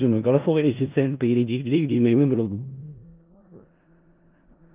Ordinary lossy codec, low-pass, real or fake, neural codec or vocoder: Opus, 32 kbps; 3.6 kHz; fake; codec, 16 kHz in and 24 kHz out, 0.4 kbps, LongCat-Audio-Codec, four codebook decoder